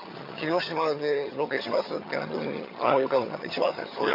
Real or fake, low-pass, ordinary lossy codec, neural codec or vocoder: fake; 5.4 kHz; MP3, 32 kbps; vocoder, 22.05 kHz, 80 mel bands, HiFi-GAN